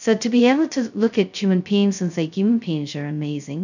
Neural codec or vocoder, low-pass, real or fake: codec, 16 kHz, 0.2 kbps, FocalCodec; 7.2 kHz; fake